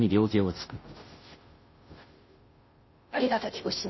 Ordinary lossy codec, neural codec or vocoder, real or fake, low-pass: MP3, 24 kbps; codec, 16 kHz, 0.5 kbps, FunCodec, trained on Chinese and English, 25 frames a second; fake; 7.2 kHz